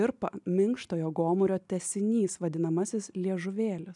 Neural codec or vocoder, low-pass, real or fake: none; 10.8 kHz; real